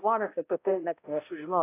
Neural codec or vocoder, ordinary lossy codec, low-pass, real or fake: codec, 16 kHz, 0.5 kbps, X-Codec, HuBERT features, trained on balanced general audio; AAC, 24 kbps; 3.6 kHz; fake